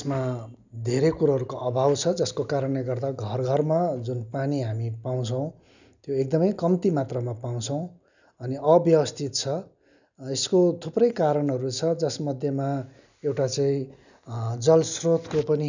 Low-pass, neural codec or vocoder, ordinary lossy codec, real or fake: 7.2 kHz; none; none; real